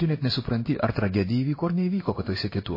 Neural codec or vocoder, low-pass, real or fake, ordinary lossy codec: codec, 16 kHz in and 24 kHz out, 1 kbps, XY-Tokenizer; 5.4 kHz; fake; MP3, 24 kbps